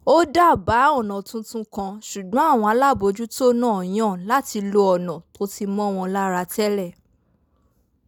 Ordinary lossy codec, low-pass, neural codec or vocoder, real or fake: none; none; none; real